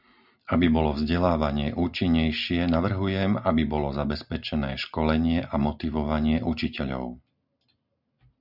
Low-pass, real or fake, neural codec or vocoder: 5.4 kHz; real; none